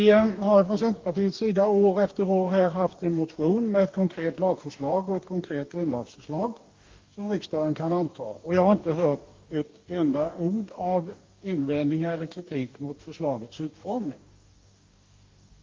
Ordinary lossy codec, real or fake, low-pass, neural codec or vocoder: Opus, 32 kbps; fake; 7.2 kHz; codec, 44.1 kHz, 2.6 kbps, DAC